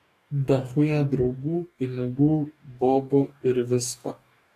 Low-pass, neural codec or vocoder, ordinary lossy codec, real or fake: 14.4 kHz; codec, 44.1 kHz, 2.6 kbps, DAC; AAC, 64 kbps; fake